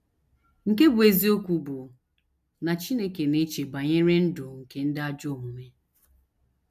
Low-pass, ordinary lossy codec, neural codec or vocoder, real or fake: 14.4 kHz; none; none; real